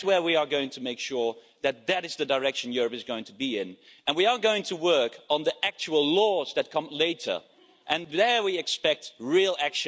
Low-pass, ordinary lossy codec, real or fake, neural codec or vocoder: none; none; real; none